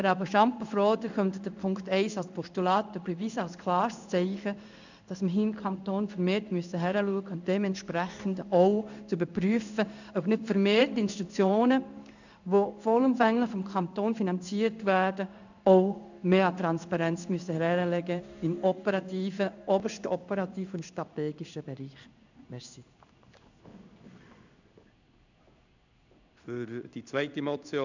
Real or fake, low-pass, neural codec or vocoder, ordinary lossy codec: fake; 7.2 kHz; codec, 16 kHz in and 24 kHz out, 1 kbps, XY-Tokenizer; none